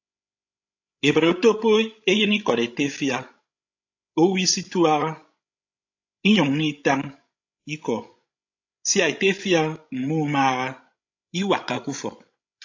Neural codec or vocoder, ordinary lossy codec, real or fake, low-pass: codec, 16 kHz, 16 kbps, FreqCodec, larger model; AAC, 48 kbps; fake; 7.2 kHz